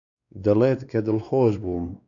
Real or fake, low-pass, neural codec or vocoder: fake; 7.2 kHz; codec, 16 kHz, 2 kbps, X-Codec, WavLM features, trained on Multilingual LibriSpeech